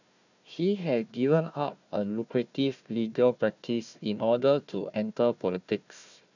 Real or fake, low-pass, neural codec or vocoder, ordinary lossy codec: fake; 7.2 kHz; codec, 16 kHz, 1 kbps, FunCodec, trained on Chinese and English, 50 frames a second; none